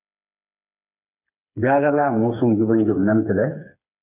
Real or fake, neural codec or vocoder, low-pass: fake; codec, 16 kHz, 4 kbps, FreqCodec, smaller model; 3.6 kHz